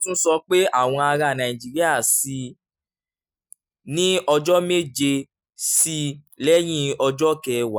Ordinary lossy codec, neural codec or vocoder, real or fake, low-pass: none; none; real; none